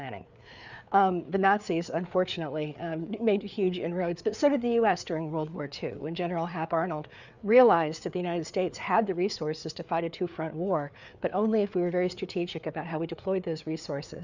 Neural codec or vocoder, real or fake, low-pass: codec, 16 kHz, 4 kbps, FreqCodec, larger model; fake; 7.2 kHz